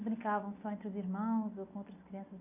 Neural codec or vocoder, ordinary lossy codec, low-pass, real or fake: none; none; 3.6 kHz; real